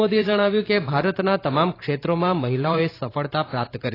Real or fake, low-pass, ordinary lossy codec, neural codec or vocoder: fake; 5.4 kHz; AAC, 24 kbps; vocoder, 44.1 kHz, 128 mel bands every 512 samples, BigVGAN v2